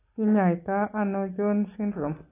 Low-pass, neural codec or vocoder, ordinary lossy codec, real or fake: 3.6 kHz; none; AAC, 16 kbps; real